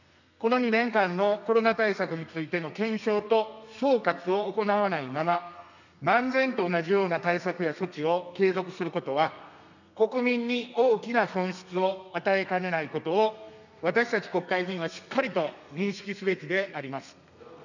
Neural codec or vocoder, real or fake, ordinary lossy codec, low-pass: codec, 32 kHz, 1.9 kbps, SNAC; fake; none; 7.2 kHz